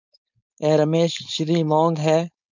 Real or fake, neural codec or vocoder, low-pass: fake; codec, 16 kHz, 4.8 kbps, FACodec; 7.2 kHz